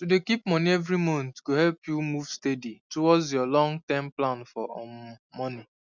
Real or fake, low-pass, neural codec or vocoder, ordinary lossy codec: real; 7.2 kHz; none; none